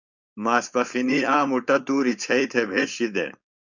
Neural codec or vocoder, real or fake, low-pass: codec, 16 kHz, 4.8 kbps, FACodec; fake; 7.2 kHz